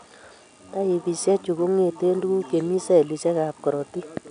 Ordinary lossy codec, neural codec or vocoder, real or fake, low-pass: none; none; real; 9.9 kHz